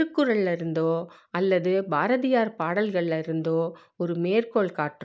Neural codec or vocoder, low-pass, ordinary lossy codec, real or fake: none; none; none; real